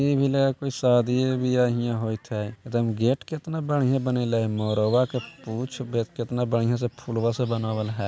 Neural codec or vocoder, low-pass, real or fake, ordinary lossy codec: none; none; real; none